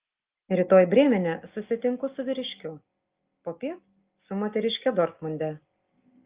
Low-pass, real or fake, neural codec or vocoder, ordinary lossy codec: 3.6 kHz; real; none; Opus, 32 kbps